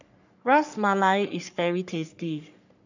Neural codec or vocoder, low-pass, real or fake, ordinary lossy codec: codec, 44.1 kHz, 3.4 kbps, Pupu-Codec; 7.2 kHz; fake; none